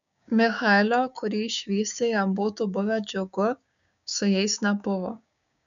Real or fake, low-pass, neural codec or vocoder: fake; 7.2 kHz; codec, 16 kHz, 6 kbps, DAC